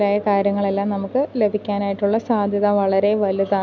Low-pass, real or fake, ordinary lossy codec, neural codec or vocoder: 7.2 kHz; real; none; none